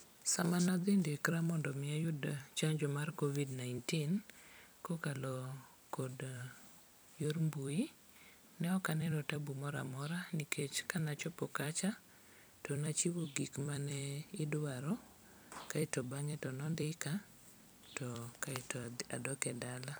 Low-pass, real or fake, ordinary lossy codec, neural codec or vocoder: none; fake; none; vocoder, 44.1 kHz, 128 mel bands every 256 samples, BigVGAN v2